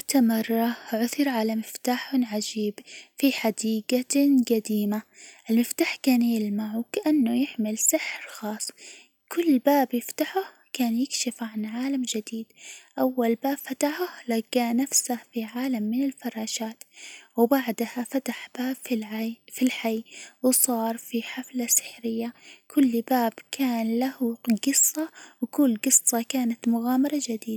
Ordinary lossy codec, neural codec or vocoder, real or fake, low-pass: none; none; real; none